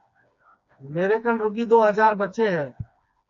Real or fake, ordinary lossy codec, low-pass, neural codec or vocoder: fake; MP3, 48 kbps; 7.2 kHz; codec, 16 kHz, 2 kbps, FreqCodec, smaller model